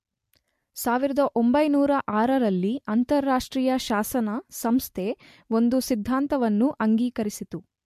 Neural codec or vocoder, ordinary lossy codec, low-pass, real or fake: none; MP3, 64 kbps; 14.4 kHz; real